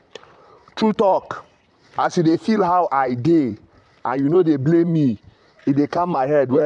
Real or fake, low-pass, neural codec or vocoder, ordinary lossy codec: fake; 10.8 kHz; vocoder, 44.1 kHz, 128 mel bands, Pupu-Vocoder; none